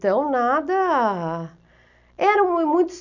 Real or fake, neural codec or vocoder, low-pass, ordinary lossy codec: real; none; 7.2 kHz; none